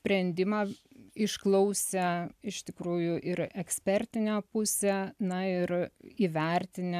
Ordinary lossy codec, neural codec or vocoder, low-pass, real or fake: AAC, 96 kbps; none; 14.4 kHz; real